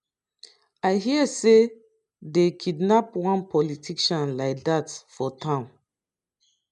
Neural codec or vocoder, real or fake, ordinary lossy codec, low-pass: none; real; MP3, 96 kbps; 10.8 kHz